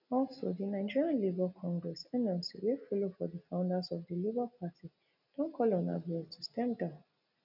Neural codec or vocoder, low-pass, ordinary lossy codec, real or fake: none; 5.4 kHz; none; real